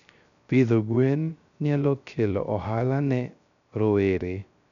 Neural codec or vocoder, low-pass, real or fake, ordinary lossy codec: codec, 16 kHz, 0.3 kbps, FocalCodec; 7.2 kHz; fake; none